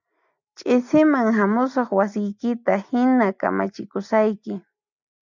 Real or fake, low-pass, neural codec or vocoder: real; 7.2 kHz; none